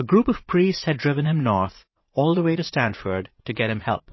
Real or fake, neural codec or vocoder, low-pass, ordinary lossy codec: fake; vocoder, 44.1 kHz, 80 mel bands, Vocos; 7.2 kHz; MP3, 24 kbps